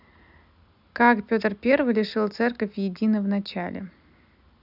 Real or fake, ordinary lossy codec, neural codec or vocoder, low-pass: real; none; none; 5.4 kHz